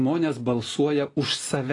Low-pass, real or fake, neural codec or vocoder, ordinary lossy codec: 10.8 kHz; real; none; AAC, 32 kbps